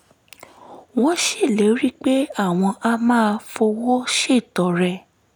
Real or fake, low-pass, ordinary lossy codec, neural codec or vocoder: real; none; none; none